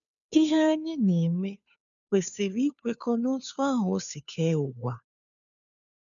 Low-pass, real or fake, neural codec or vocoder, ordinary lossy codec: 7.2 kHz; fake; codec, 16 kHz, 2 kbps, FunCodec, trained on Chinese and English, 25 frames a second; none